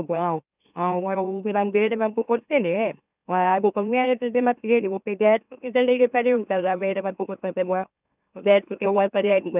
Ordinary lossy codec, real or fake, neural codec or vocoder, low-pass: none; fake; autoencoder, 44.1 kHz, a latent of 192 numbers a frame, MeloTTS; 3.6 kHz